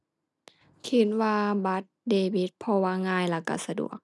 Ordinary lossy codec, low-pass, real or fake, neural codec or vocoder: none; none; real; none